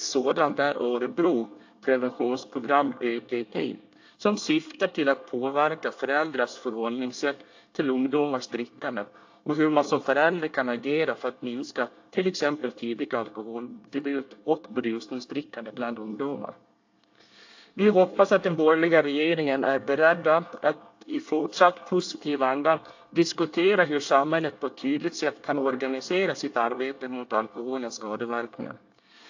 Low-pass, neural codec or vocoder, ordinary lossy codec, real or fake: 7.2 kHz; codec, 24 kHz, 1 kbps, SNAC; AAC, 48 kbps; fake